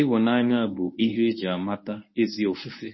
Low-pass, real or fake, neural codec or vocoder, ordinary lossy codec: 7.2 kHz; fake; codec, 24 kHz, 0.9 kbps, WavTokenizer, medium speech release version 1; MP3, 24 kbps